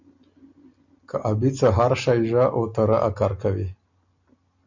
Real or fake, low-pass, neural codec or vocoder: real; 7.2 kHz; none